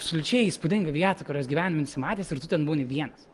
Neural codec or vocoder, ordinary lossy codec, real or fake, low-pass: none; Opus, 24 kbps; real; 10.8 kHz